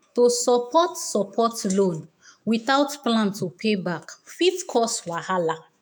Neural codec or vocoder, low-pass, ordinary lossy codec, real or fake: autoencoder, 48 kHz, 128 numbers a frame, DAC-VAE, trained on Japanese speech; none; none; fake